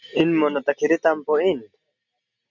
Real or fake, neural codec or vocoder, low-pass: real; none; 7.2 kHz